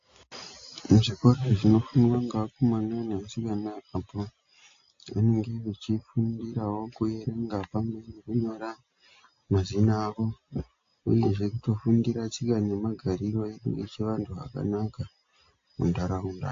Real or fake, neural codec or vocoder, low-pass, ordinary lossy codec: real; none; 7.2 kHz; AAC, 48 kbps